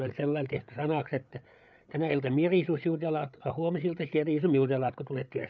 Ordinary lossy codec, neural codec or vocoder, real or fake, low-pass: none; codec, 16 kHz, 8 kbps, FreqCodec, larger model; fake; none